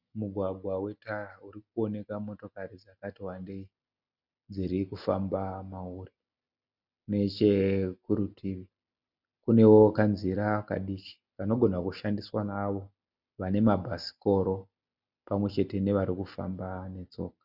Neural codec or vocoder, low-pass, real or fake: none; 5.4 kHz; real